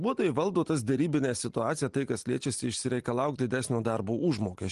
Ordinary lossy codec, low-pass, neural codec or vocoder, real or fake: Opus, 24 kbps; 10.8 kHz; none; real